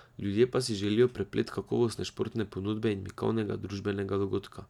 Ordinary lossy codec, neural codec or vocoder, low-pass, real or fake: none; none; 19.8 kHz; real